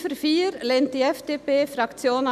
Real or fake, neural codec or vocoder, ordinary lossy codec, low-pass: real; none; none; 14.4 kHz